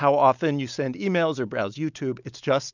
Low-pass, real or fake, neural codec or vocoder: 7.2 kHz; real; none